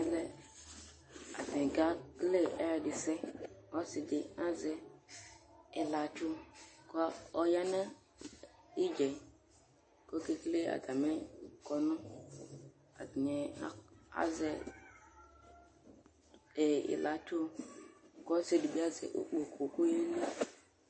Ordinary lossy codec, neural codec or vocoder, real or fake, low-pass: MP3, 32 kbps; none; real; 9.9 kHz